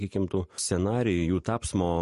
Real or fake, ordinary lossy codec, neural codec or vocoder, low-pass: real; MP3, 48 kbps; none; 14.4 kHz